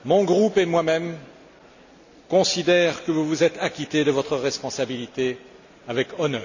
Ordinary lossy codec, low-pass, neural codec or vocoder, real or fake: none; 7.2 kHz; none; real